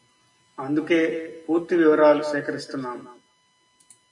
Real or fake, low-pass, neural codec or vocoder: real; 10.8 kHz; none